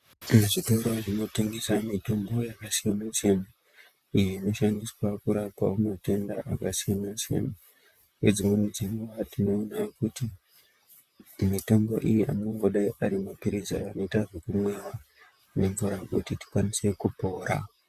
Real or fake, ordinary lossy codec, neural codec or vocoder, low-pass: fake; Opus, 64 kbps; vocoder, 44.1 kHz, 128 mel bands, Pupu-Vocoder; 14.4 kHz